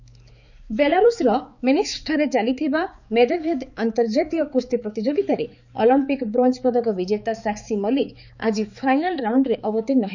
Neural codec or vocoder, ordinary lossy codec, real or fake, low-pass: codec, 16 kHz, 4 kbps, X-Codec, HuBERT features, trained on balanced general audio; none; fake; 7.2 kHz